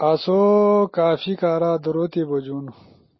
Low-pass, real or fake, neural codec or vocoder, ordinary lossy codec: 7.2 kHz; real; none; MP3, 24 kbps